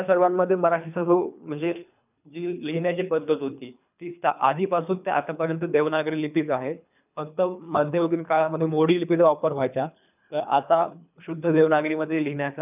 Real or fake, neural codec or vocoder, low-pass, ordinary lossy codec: fake; codec, 24 kHz, 3 kbps, HILCodec; 3.6 kHz; none